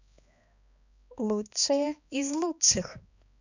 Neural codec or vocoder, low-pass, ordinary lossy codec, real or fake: codec, 16 kHz, 2 kbps, X-Codec, HuBERT features, trained on balanced general audio; 7.2 kHz; none; fake